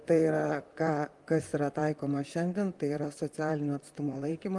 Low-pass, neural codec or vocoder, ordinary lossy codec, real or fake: 10.8 kHz; vocoder, 44.1 kHz, 128 mel bands, Pupu-Vocoder; Opus, 24 kbps; fake